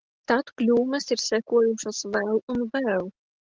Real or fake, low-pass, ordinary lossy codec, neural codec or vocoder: real; 7.2 kHz; Opus, 16 kbps; none